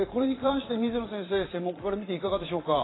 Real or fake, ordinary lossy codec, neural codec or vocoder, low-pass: fake; AAC, 16 kbps; vocoder, 22.05 kHz, 80 mel bands, Vocos; 7.2 kHz